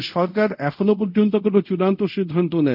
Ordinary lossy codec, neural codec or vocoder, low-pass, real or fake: none; codec, 24 kHz, 0.5 kbps, DualCodec; 5.4 kHz; fake